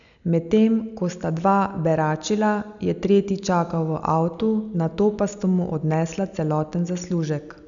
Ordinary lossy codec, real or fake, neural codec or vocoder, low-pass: none; real; none; 7.2 kHz